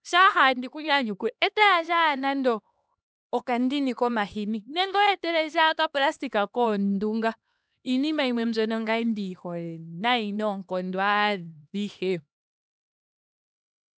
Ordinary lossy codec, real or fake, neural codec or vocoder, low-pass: none; fake; codec, 16 kHz, 2 kbps, X-Codec, HuBERT features, trained on LibriSpeech; none